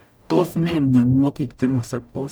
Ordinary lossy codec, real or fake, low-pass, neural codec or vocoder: none; fake; none; codec, 44.1 kHz, 0.9 kbps, DAC